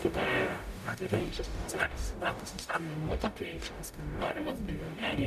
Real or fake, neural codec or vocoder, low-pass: fake; codec, 44.1 kHz, 0.9 kbps, DAC; 14.4 kHz